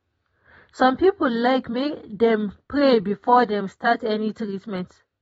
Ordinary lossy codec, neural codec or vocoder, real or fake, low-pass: AAC, 24 kbps; vocoder, 44.1 kHz, 128 mel bands every 256 samples, BigVGAN v2; fake; 19.8 kHz